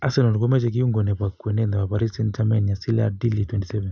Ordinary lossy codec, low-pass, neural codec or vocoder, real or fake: none; 7.2 kHz; none; real